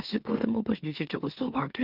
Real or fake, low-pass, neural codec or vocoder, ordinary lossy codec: fake; 5.4 kHz; autoencoder, 44.1 kHz, a latent of 192 numbers a frame, MeloTTS; Opus, 24 kbps